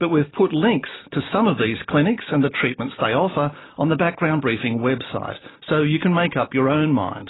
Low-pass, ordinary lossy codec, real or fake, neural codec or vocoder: 7.2 kHz; AAC, 16 kbps; real; none